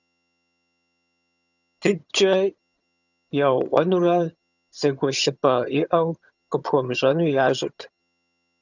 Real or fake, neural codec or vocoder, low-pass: fake; vocoder, 22.05 kHz, 80 mel bands, HiFi-GAN; 7.2 kHz